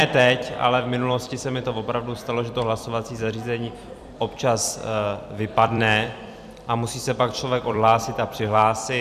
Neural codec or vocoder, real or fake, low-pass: vocoder, 44.1 kHz, 128 mel bands every 256 samples, BigVGAN v2; fake; 14.4 kHz